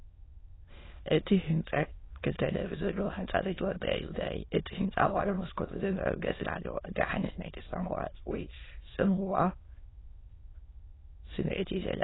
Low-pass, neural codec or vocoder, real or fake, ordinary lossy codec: 7.2 kHz; autoencoder, 22.05 kHz, a latent of 192 numbers a frame, VITS, trained on many speakers; fake; AAC, 16 kbps